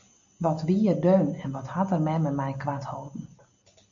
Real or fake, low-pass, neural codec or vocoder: real; 7.2 kHz; none